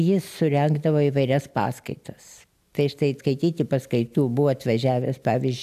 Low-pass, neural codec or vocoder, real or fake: 14.4 kHz; none; real